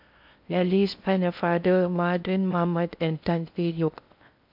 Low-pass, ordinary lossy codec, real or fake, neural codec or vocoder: 5.4 kHz; MP3, 48 kbps; fake; codec, 16 kHz in and 24 kHz out, 0.6 kbps, FocalCodec, streaming, 4096 codes